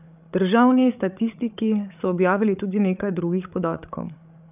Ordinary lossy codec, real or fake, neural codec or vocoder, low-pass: none; fake; codec, 16 kHz, 8 kbps, FreqCodec, larger model; 3.6 kHz